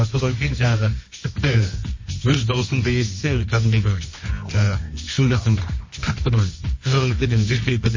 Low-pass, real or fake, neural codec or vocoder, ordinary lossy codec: 7.2 kHz; fake; codec, 24 kHz, 0.9 kbps, WavTokenizer, medium music audio release; MP3, 32 kbps